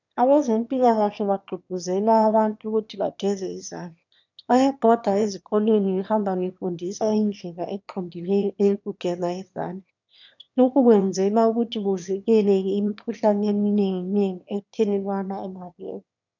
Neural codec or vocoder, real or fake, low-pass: autoencoder, 22.05 kHz, a latent of 192 numbers a frame, VITS, trained on one speaker; fake; 7.2 kHz